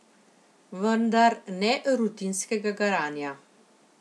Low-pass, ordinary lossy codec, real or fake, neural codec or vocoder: none; none; real; none